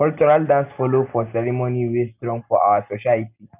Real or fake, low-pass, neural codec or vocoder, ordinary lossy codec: real; 3.6 kHz; none; none